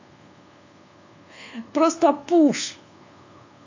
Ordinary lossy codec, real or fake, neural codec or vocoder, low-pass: AAC, 48 kbps; fake; codec, 24 kHz, 1.2 kbps, DualCodec; 7.2 kHz